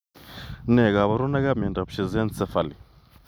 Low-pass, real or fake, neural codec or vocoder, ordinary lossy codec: none; real; none; none